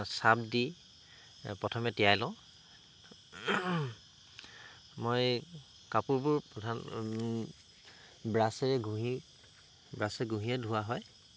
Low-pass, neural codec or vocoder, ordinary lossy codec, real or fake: none; none; none; real